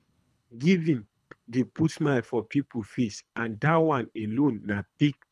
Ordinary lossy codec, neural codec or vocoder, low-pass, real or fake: none; codec, 24 kHz, 3 kbps, HILCodec; none; fake